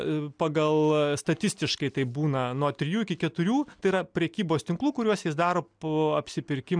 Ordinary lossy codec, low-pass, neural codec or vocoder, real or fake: MP3, 96 kbps; 9.9 kHz; none; real